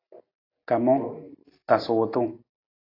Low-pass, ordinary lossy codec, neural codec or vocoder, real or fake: 5.4 kHz; AAC, 24 kbps; none; real